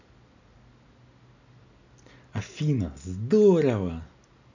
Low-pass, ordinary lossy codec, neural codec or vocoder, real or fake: 7.2 kHz; none; none; real